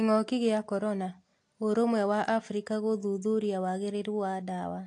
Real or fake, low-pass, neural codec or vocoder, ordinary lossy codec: real; 10.8 kHz; none; AAC, 48 kbps